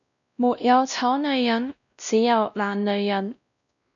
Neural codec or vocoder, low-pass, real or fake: codec, 16 kHz, 1 kbps, X-Codec, WavLM features, trained on Multilingual LibriSpeech; 7.2 kHz; fake